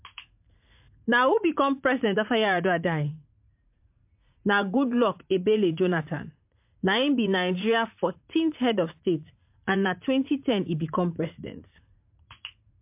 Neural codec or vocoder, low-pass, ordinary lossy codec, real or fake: vocoder, 44.1 kHz, 80 mel bands, Vocos; 3.6 kHz; MP3, 32 kbps; fake